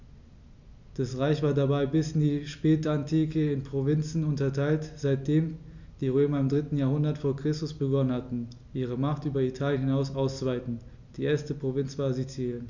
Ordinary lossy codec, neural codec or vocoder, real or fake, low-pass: none; none; real; 7.2 kHz